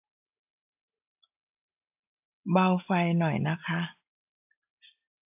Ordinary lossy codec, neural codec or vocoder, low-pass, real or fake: AAC, 32 kbps; none; 3.6 kHz; real